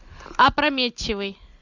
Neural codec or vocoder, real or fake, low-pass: none; real; 7.2 kHz